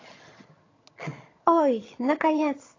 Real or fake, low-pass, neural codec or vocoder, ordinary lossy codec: fake; 7.2 kHz; vocoder, 22.05 kHz, 80 mel bands, HiFi-GAN; AAC, 32 kbps